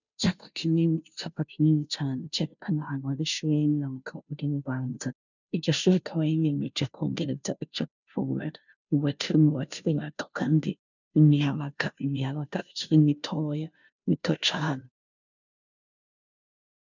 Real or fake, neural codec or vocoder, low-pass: fake; codec, 16 kHz, 0.5 kbps, FunCodec, trained on Chinese and English, 25 frames a second; 7.2 kHz